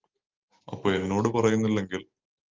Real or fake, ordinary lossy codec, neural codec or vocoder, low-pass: real; Opus, 32 kbps; none; 7.2 kHz